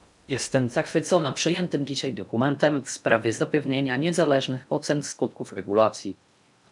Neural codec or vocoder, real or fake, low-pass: codec, 16 kHz in and 24 kHz out, 0.6 kbps, FocalCodec, streaming, 2048 codes; fake; 10.8 kHz